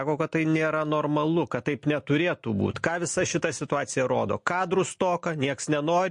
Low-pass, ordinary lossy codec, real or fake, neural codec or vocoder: 10.8 kHz; MP3, 48 kbps; real; none